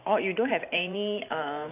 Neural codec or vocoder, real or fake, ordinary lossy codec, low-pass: vocoder, 44.1 kHz, 128 mel bands every 512 samples, BigVGAN v2; fake; none; 3.6 kHz